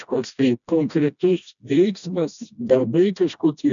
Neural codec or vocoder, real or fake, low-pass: codec, 16 kHz, 1 kbps, FreqCodec, smaller model; fake; 7.2 kHz